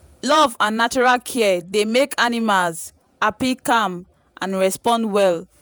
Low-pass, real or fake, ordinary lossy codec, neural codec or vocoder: none; fake; none; vocoder, 48 kHz, 128 mel bands, Vocos